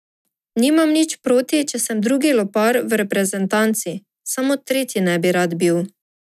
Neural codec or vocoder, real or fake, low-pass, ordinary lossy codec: none; real; 14.4 kHz; none